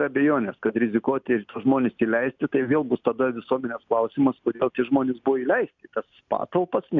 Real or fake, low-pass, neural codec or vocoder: real; 7.2 kHz; none